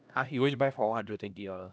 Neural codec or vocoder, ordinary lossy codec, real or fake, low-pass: codec, 16 kHz, 1 kbps, X-Codec, HuBERT features, trained on LibriSpeech; none; fake; none